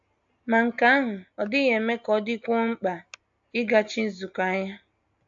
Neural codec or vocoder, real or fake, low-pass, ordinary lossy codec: none; real; 7.2 kHz; AAC, 64 kbps